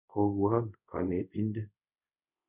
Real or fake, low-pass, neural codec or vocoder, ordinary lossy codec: fake; 3.6 kHz; codec, 24 kHz, 0.5 kbps, DualCodec; none